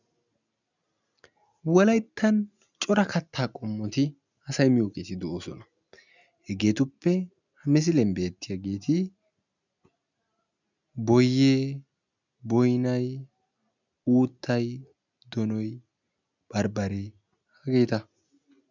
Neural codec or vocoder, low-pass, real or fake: none; 7.2 kHz; real